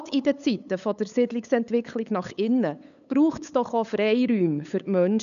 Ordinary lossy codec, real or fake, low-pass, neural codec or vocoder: none; fake; 7.2 kHz; codec, 16 kHz, 8 kbps, FunCodec, trained on LibriTTS, 25 frames a second